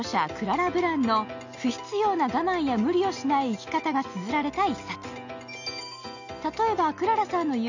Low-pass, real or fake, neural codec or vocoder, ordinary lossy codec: 7.2 kHz; real; none; none